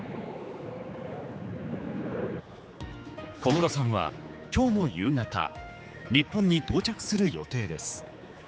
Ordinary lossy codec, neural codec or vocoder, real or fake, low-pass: none; codec, 16 kHz, 4 kbps, X-Codec, HuBERT features, trained on general audio; fake; none